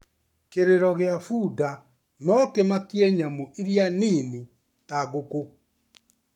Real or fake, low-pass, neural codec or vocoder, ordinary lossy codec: fake; 19.8 kHz; codec, 44.1 kHz, 7.8 kbps, Pupu-Codec; none